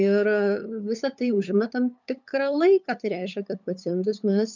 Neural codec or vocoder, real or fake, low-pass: codec, 16 kHz, 8 kbps, FunCodec, trained on LibriTTS, 25 frames a second; fake; 7.2 kHz